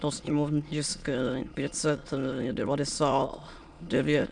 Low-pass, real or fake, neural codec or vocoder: 9.9 kHz; fake; autoencoder, 22.05 kHz, a latent of 192 numbers a frame, VITS, trained on many speakers